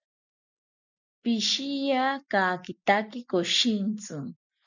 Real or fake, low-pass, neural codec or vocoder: real; 7.2 kHz; none